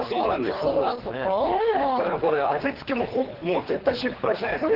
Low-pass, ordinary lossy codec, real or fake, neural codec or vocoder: 5.4 kHz; Opus, 16 kbps; fake; codec, 24 kHz, 3 kbps, HILCodec